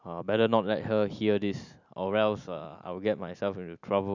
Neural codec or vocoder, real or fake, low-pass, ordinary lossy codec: none; real; 7.2 kHz; none